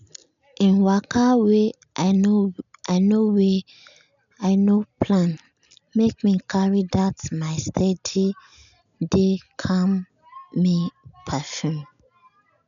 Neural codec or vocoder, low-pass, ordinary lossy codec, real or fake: none; 7.2 kHz; none; real